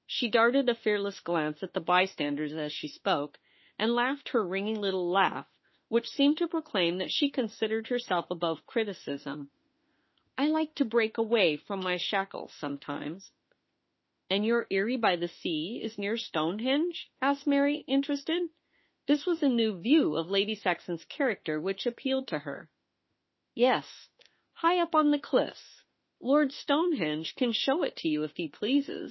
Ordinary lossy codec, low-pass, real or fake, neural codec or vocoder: MP3, 24 kbps; 7.2 kHz; fake; autoencoder, 48 kHz, 32 numbers a frame, DAC-VAE, trained on Japanese speech